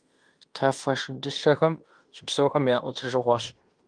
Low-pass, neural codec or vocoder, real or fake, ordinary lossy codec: 9.9 kHz; codec, 16 kHz in and 24 kHz out, 0.9 kbps, LongCat-Audio-Codec, fine tuned four codebook decoder; fake; Opus, 32 kbps